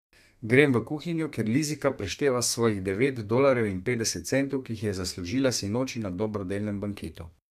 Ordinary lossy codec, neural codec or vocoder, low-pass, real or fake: none; codec, 32 kHz, 1.9 kbps, SNAC; 14.4 kHz; fake